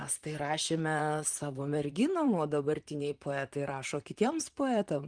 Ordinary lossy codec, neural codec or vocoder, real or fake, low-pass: Opus, 32 kbps; vocoder, 44.1 kHz, 128 mel bands, Pupu-Vocoder; fake; 9.9 kHz